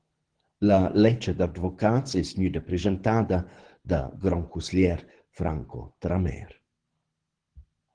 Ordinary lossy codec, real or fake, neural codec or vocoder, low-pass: Opus, 16 kbps; fake; codec, 24 kHz, 6 kbps, HILCodec; 9.9 kHz